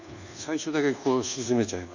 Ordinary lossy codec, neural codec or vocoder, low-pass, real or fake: none; codec, 24 kHz, 1.2 kbps, DualCodec; 7.2 kHz; fake